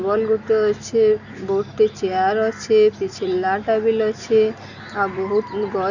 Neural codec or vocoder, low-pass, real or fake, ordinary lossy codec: none; 7.2 kHz; real; none